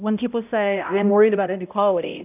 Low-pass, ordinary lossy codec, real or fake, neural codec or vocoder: 3.6 kHz; AAC, 32 kbps; fake; codec, 16 kHz, 0.5 kbps, X-Codec, HuBERT features, trained on balanced general audio